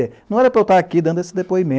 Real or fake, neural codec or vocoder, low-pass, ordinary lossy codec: real; none; none; none